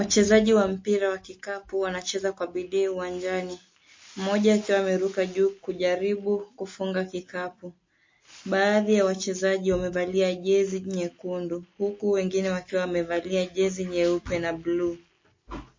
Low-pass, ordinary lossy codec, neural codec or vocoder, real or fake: 7.2 kHz; MP3, 32 kbps; none; real